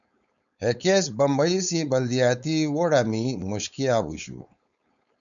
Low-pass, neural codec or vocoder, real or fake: 7.2 kHz; codec, 16 kHz, 4.8 kbps, FACodec; fake